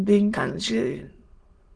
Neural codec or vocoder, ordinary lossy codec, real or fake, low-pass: autoencoder, 22.05 kHz, a latent of 192 numbers a frame, VITS, trained on many speakers; Opus, 16 kbps; fake; 9.9 kHz